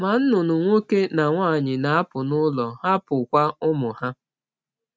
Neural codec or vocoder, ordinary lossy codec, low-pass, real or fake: none; none; none; real